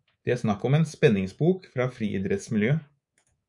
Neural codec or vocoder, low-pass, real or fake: codec, 24 kHz, 3.1 kbps, DualCodec; 10.8 kHz; fake